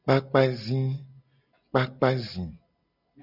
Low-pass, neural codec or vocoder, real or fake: 5.4 kHz; none; real